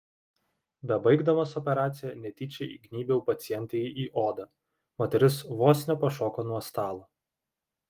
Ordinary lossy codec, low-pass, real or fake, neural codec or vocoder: Opus, 24 kbps; 14.4 kHz; real; none